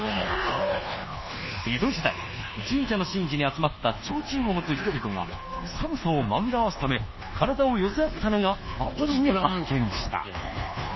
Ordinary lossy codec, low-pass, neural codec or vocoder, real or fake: MP3, 24 kbps; 7.2 kHz; codec, 24 kHz, 1.2 kbps, DualCodec; fake